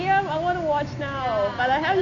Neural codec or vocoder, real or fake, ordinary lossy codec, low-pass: none; real; none; 7.2 kHz